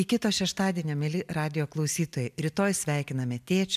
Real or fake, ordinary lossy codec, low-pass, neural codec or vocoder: real; MP3, 96 kbps; 14.4 kHz; none